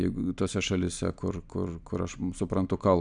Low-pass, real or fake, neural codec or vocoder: 9.9 kHz; real; none